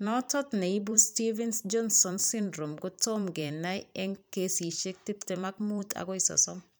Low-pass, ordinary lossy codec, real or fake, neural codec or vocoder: none; none; fake; vocoder, 44.1 kHz, 128 mel bands every 512 samples, BigVGAN v2